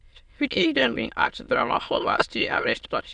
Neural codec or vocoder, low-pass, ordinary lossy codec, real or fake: autoencoder, 22.05 kHz, a latent of 192 numbers a frame, VITS, trained on many speakers; 9.9 kHz; none; fake